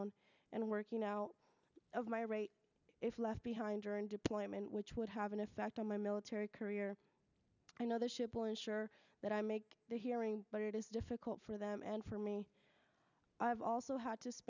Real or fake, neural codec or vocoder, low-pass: real; none; 7.2 kHz